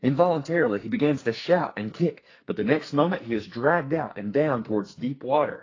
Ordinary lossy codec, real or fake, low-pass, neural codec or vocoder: AAC, 32 kbps; fake; 7.2 kHz; codec, 44.1 kHz, 2.6 kbps, SNAC